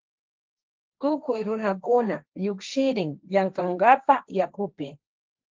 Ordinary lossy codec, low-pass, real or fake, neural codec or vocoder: Opus, 32 kbps; 7.2 kHz; fake; codec, 16 kHz, 1.1 kbps, Voila-Tokenizer